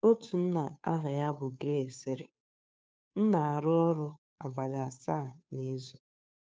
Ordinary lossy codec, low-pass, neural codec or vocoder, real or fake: none; none; codec, 16 kHz, 2 kbps, FunCodec, trained on Chinese and English, 25 frames a second; fake